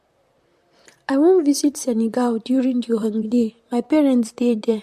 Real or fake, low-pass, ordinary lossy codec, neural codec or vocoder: fake; 14.4 kHz; MP3, 64 kbps; vocoder, 44.1 kHz, 128 mel bands, Pupu-Vocoder